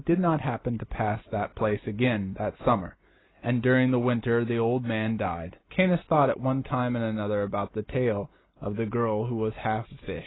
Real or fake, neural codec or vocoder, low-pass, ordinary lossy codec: real; none; 7.2 kHz; AAC, 16 kbps